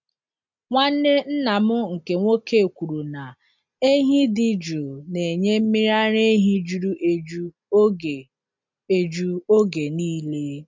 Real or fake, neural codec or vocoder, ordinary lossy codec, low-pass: real; none; MP3, 64 kbps; 7.2 kHz